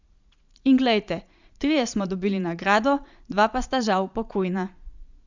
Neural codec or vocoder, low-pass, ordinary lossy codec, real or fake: vocoder, 44.1 kHz, 80 mel bands, Vocos; 7.2 kHz; Opus, 64 kbps; fake